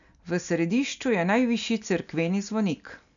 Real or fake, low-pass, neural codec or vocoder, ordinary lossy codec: real; 7.2 kHz; none; none